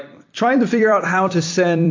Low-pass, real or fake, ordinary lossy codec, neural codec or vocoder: 7.2 kHz; fake; AAC, 48 kbps; vocoder, 44.1 kHz, 128 mel bands every 256 samples, BigVGAN v2